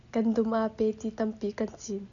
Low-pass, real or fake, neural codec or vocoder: 7.2 kHz; real; none